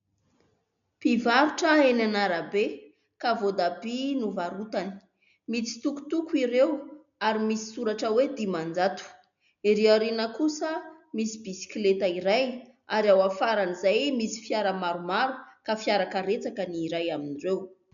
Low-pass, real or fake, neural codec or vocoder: 7.2 kHz; real; none